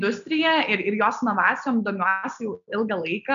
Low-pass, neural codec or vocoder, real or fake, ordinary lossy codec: 7.2 kHz; none; real; AAC, 96 kbps